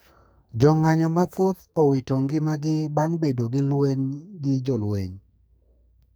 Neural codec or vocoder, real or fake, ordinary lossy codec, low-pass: codec, 44.1 kHz, 2.6 kbps, SNAC; fake; none; none